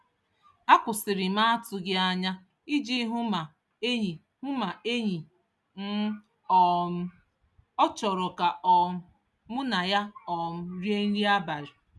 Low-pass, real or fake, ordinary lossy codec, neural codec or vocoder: none; real; none; none